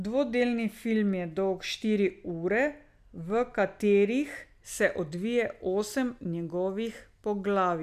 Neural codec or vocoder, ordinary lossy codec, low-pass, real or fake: none; MP3, 96 kbps; 14.4 kHz; real